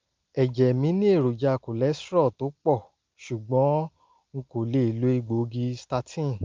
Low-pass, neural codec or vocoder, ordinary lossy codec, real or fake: 7.2 kHz; none; Opus, 32 kbps; real